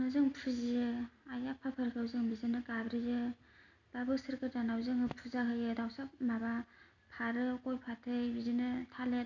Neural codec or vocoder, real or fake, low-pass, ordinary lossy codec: none; real; 7.2 kHz; none